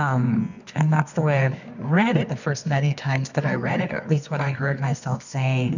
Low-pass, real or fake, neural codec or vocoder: 7.2 kHz; fake; codec, 24 kHz, 0.9 kbps, WavTokenizer, medium music audio release